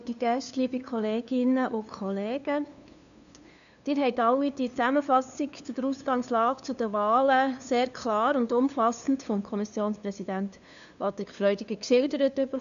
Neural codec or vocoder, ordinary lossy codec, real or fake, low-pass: codec, 16 kHz, 2 kbps, FunCodec, trained on LibriTTS, 25 frames a second; none; fake; 7.2 kHz